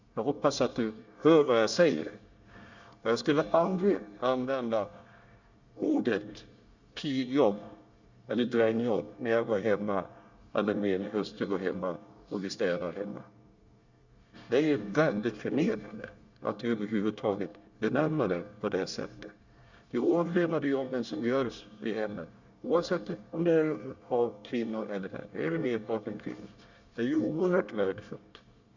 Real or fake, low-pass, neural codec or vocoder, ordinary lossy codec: fake; 7.2 kHz; codec, 24 kHz, 1 kbps, SNAC; Opus, 64 kbps